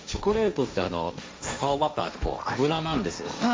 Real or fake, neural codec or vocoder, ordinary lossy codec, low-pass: fake; codec, 16 kHz, 1.1 kbps, Voila-Tokenizer; none; none